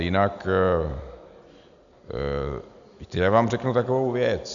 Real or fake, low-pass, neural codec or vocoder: real; 7.2 kHz; none